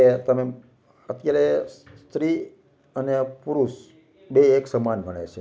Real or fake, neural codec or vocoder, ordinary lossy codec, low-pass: real; none; none; none